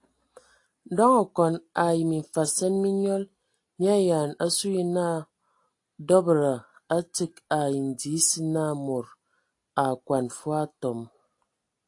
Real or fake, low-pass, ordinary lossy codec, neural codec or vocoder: real; 10.8 kHz; AAC, 48 kbps; none